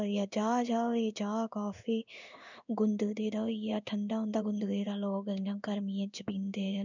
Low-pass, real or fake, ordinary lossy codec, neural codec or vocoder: 7.2 kHz; fake; none; codec, 16 kHz in and 24 kHz out, 1 kbps, XY-Tokenizer